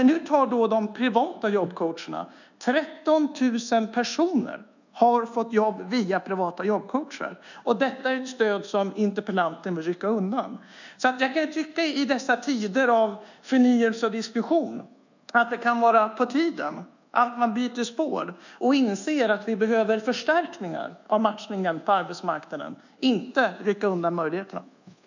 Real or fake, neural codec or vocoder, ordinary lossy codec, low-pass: fake; codec, 24 kHz, 1.2 kbps, DualCodec; none; 7.2 kHz